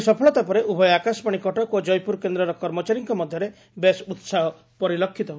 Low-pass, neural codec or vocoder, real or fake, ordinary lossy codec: none; none; real; none